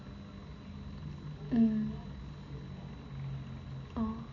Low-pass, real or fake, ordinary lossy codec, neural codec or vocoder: 7.2 kHz; real; MP3, 32 kbps; none